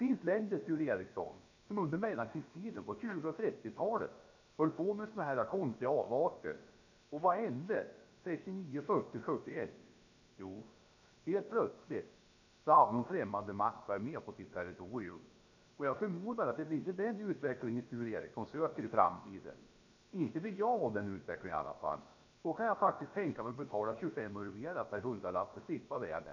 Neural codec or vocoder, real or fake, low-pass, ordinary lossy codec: codec, 16 kHz, about 1 kbps, DyCAST, with the encoder's durations; fake; 7.2 kHz; none